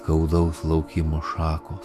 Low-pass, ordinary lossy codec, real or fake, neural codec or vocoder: 14.4 kHz; AAC, 96 kbps; fake; autoencoder, 48 kHz, 128 numbers a frame, DAC-VAE, trained on Japanese speech